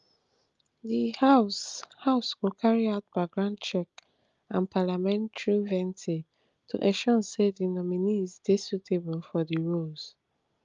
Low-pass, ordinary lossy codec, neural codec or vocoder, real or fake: 7.2 kHz; Opus, 32 kbps; none; real